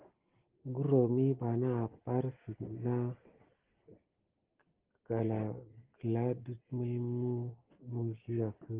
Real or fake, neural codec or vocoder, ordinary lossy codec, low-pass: real; none; Opus, 16 kbps; 3.6 kHz